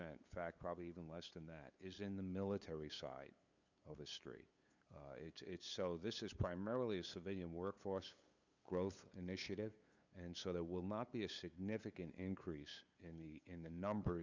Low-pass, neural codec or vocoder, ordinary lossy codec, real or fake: 7.2 kHz; codec, 16 kHz, 8 kbps, FunCodec, trained on LibriTTS, 25 frames a second; Opus, 64 kbps; fake